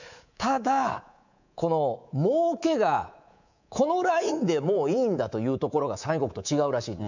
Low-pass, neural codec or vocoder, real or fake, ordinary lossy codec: 7.2 kHz; codec, 24 kHz, 3.1 kbps, DualCodec; fake; none